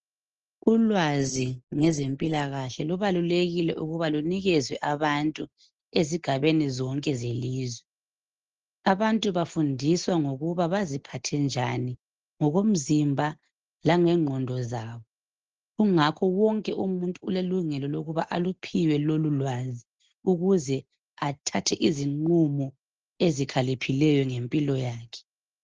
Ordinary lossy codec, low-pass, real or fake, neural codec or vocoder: Opus, 16 kbps; 7.2 kHz; real; none